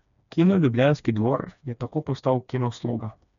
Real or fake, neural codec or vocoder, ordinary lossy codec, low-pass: fake; codec, 16 kHz, 2 kbps, FreqCodec, smaller model; none; 7.2 kHz